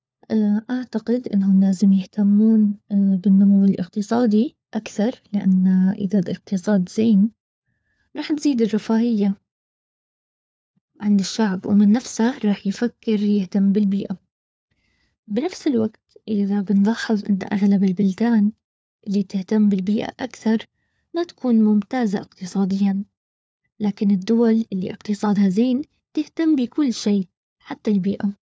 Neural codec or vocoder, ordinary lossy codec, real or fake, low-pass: codec, 16 kHz, 4 kbps, FunCodec, trained on LibriTTS, 50 frames a second; none; fake; none